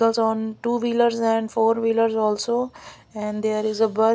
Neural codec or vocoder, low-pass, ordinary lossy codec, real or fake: none; none; none; real